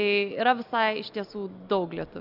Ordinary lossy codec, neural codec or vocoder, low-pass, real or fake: AAC, 48 kbps; none; 5.4 kHz; real